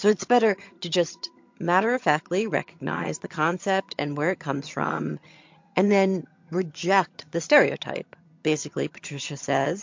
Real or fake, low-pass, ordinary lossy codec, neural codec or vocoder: fake; 7.2 kHz; MP3, 48 kbps; vocoder, 22.05 kHz, 80 mel bands, HiFi-GAN